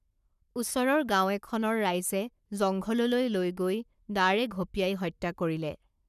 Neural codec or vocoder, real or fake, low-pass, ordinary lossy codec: codec, 44.1 kHz, 7.8 kbps, Pupu-Codec; fake; 14.4 kHz; none